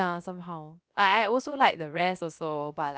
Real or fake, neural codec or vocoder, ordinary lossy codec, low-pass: fake; codec, 16 kHz, about 1 kbps, DyCAST, with the encoder's durations; none; none